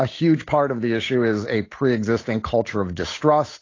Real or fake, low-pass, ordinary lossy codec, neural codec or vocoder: fake; 7.2 kHz; AAC, 32 kbps; codec, 16 kHz, 8 kbps, FunCodec, trained on Chinese and English, 25 frames a second